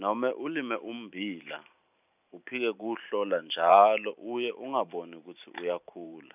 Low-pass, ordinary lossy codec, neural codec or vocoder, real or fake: 3.6 kHz; none; vocoder, 44.1 kHz, 128 mel bands every 512 samples, BigVGAN v2; fake